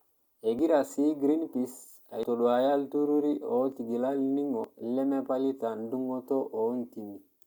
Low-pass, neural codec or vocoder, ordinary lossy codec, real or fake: none; none; none; real